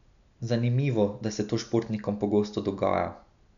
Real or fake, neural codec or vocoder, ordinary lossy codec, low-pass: real; none; none; 7.2 kHz